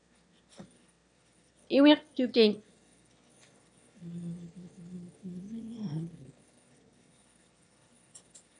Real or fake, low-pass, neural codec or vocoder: fake; 9.9 kHz; autoencoder, 22.05 kHz, a latent of 192 numbers a frame, VITS, trained on one speaker